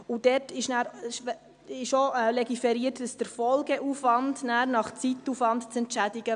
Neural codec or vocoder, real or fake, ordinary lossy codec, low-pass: none; real; none; 9.9 kHz